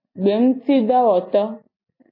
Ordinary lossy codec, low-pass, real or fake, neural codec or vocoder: MP3, 24 kbps; 5.4 kHz; real; none